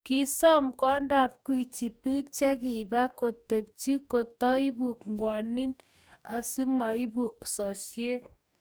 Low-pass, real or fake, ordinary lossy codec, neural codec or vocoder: none; fake; none; codec, 44.1 kHz, 2.6 kbps, DAC